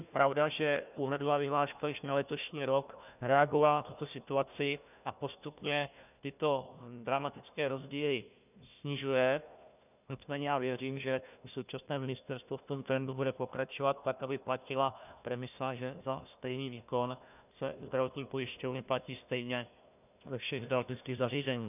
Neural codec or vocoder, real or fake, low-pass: codec, 16 kHz, 1 kbps, FunCodec, trained on Chinese and English, 50 frames a second; fake; 3.6 kHz